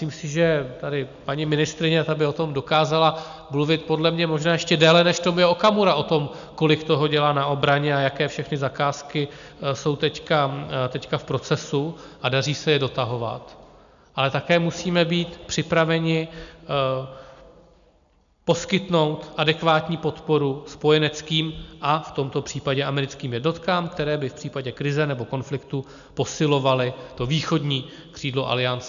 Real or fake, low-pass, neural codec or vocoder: real; 7.2 kHz; none